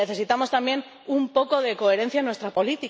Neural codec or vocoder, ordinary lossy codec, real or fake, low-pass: none; none; real; none